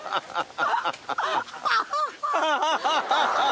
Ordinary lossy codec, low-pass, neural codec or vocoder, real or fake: none; none; none; real